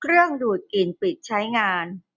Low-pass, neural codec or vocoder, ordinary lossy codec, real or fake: 7.2 kHz; none; none; real